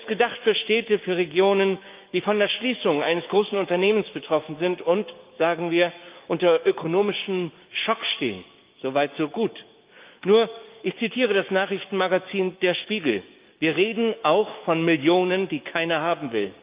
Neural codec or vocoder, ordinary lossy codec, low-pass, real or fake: codec, 16 kHz, 6 kbps, DAC; Opus, 24 kbps; 3.6 kHz; fake